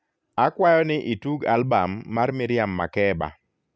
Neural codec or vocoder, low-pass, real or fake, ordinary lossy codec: none; none; real; none